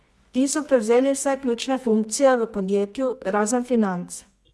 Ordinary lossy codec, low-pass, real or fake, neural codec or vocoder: none; none; fake; codec, 24 kHz, 0.9 kbps, WavTokenizer, medium music audio release